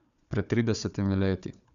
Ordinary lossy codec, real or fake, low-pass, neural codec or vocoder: none; fake; 7.2 kHz; codec, 16 kHz, 4 kbps, FunCodec, trained on Chinese and English, 50 frames a second